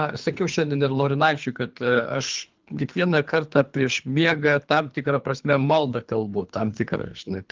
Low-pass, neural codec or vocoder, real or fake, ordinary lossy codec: 7.2 kHz; codec, 24 kHz, 3 kbps, HILCodec; fake; Opus, 24 kbps